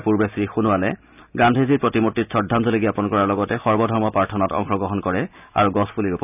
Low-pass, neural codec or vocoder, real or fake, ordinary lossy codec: 3.6 kHz; none; real; none